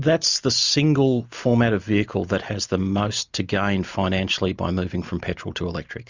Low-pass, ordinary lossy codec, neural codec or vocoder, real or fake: 7.2 kHz; Opus, 64 kbps; none; real